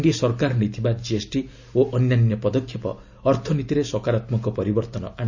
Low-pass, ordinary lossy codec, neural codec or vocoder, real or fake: 7.2 kHz; none; none; real